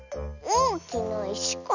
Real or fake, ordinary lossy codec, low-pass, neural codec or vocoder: fake; none; 7.2 kHz; vocoder, 44.1 kHz, 128 mel bands every 256 samples, BigVGAN v2